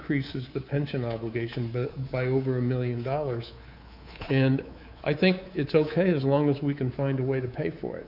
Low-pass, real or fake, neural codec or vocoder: 5.4 kHz; fake; codec, 24 kHz, 3.1 kbps, DualCodec